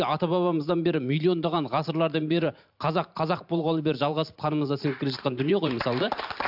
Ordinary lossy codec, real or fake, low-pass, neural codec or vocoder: AAC, 48 kbps; fake; 5.4 kHz; vocoder, 44.1 kHz, 128 mel bands every 512 samples, BigVGAN v2